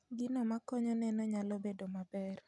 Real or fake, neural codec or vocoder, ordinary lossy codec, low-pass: real; none; none; 10.8 kHz